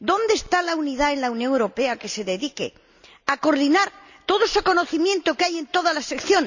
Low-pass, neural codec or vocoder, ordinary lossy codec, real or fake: 7.2 kHz; none; none; real